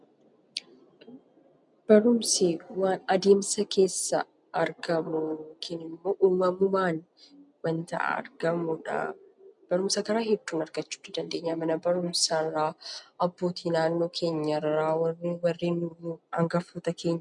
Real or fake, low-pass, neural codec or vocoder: real; 10.8 kHz; none